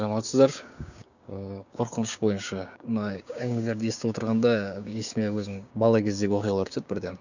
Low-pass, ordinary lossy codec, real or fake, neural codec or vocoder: 7.2 kHz; none; fake; codec, 16 kHz, 6 kbps, DAC